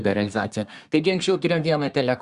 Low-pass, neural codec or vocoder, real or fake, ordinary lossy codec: 10.8 kHz; codec, 24 kHz, 1 kbps, SNAC; fake; Opus, 64 kbps